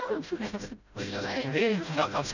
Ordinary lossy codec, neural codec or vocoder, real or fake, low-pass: none; codec, 16 kHz, 0.5 kbps, FreqCodec, smaller model; fake; 7.2 kHz